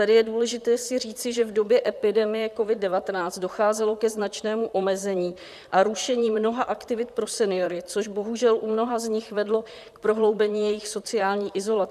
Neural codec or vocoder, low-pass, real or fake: vocoder, 44.1 kHz, 128 mel bands, Pupu-Vocoder; 14.4 kHz; fake